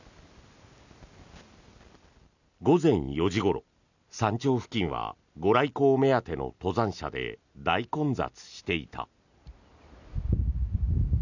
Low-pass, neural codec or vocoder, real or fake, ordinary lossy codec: 7.2 kHz; none; real; none